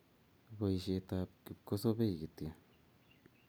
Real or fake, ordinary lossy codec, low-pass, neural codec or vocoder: real; none; none; none